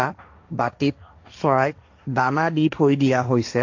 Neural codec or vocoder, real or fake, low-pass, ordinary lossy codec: codec, 16 kHz, 1.1 kbps, Voila-Tokenizer; fake; 7.2 kHz; AAC, 48 kbps